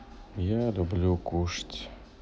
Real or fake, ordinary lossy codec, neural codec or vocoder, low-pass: real; none; none; none